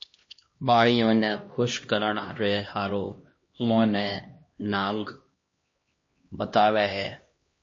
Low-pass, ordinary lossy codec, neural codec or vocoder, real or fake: 7.2 kHz; MP3, 32 kbps; codec, 16 kHz, 1 kbps, X-Codec, HuBERT features, trained on LibriSpeech; fake